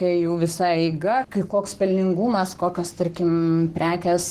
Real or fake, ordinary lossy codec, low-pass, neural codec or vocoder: fake; Opus, 16 kbps; 14.4 kHz; codec, 44.1 kHz, 7.8 kbps, Pupu-Codec